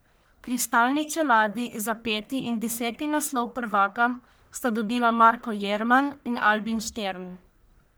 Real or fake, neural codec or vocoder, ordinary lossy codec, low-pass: fake; codec, 44.1 kHz, 1.7 kbps, Pupu-Codec; none; none